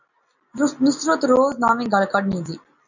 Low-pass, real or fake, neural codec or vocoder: 7.2 kHz; real; none